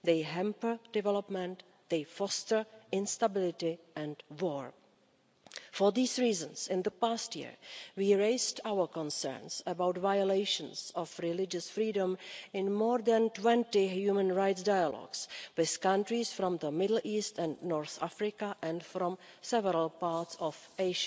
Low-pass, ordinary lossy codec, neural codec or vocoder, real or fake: none; none; none; real